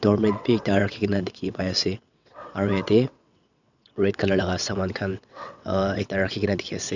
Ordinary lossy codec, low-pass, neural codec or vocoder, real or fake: none; 7.2 kHz; vocoder, 22.05 kHz, 80 mel bands, WaveNeXt; fake